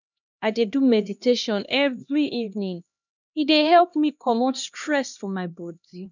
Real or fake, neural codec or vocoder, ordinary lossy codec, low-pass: fake; codec, 16 kHz, 1 kbps, X-Codec, HuBERT features, trained on LibriSpeech; none; 7.2 kHz